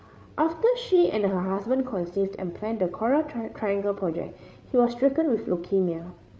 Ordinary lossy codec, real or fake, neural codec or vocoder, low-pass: none; fake; codec, 16 kHz, 8 kbps, FreqCodec, larger model; none